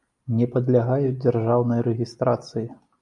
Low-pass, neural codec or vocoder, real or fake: 10.8 kHz; none; real